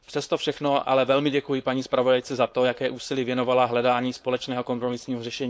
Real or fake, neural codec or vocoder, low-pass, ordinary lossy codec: fake; codec, 16 kHz, 4.8 kbps, FACodec; none; none